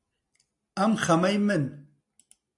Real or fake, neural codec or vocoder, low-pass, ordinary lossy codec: real; none; 10.8 kHz; MP3, 64 kbps